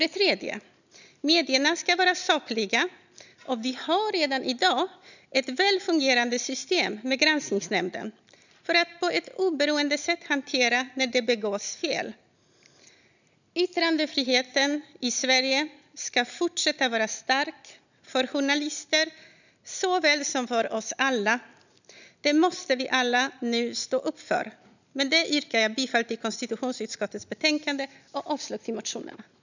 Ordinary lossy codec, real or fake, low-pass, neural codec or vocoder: none; real; 7.2 kHz; none